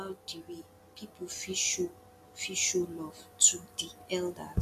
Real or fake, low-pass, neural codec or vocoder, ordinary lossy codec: real; 14.4 kHz; none; none